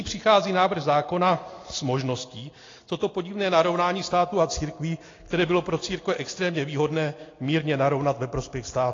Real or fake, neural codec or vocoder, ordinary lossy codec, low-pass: real; none; AAC, 32 kbps; 7.2 kHz